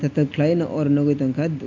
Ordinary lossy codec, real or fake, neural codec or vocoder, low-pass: MP3, 48 kbps; real; none; 7.2 kHz